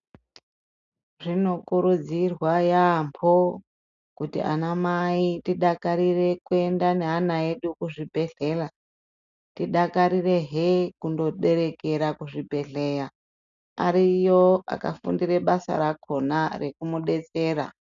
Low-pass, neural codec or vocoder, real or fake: 7.2 kHz; none; real